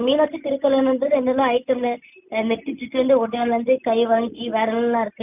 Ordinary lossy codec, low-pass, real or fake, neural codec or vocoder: none; 3.6 kHz; real; none